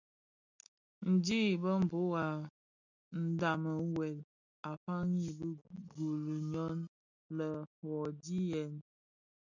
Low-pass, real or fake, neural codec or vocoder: 7.2 kHz; real; none